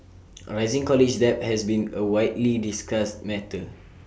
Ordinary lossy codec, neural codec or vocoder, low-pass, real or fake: none; none; none; real